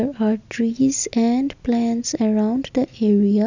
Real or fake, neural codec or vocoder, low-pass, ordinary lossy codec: real; none; 7.2 kHz; none